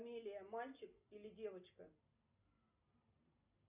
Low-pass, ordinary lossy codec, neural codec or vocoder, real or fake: 3.6 kHz; Opus, 64 kbps; none; real